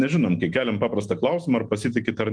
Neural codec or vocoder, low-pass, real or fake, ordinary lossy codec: none; 9.9 kHz; real; Opus, 32 kbps